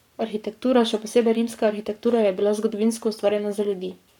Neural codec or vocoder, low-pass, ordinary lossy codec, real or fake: codec, 44.1 kHz, 7.8 kbps, Pupu-Codec; 19.8 kHz; none; fake